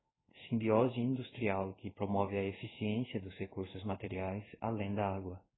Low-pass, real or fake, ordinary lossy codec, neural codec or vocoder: 7.2 kHz; fake; AAC, 16 kbps; codec, 16 kHz in and 24 kHz out, 1 kbps, XY-Tokenizer